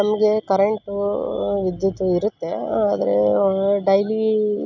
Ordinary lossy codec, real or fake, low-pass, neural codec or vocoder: none; real; 7.2 kHz; none